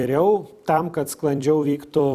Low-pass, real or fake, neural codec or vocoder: 14.4 kHz; fake; vocoder, 44.1 kHz, 128 mel bands every 256 samples, BigVGAN v2